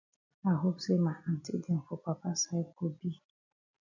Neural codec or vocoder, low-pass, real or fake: none; 7.2 kHz; real